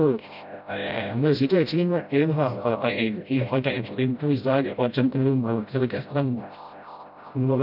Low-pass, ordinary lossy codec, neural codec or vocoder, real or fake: 5.4 kHz; none; codec, 16 kHz, 0.5 kbps, FreqCodec, smaller model; fake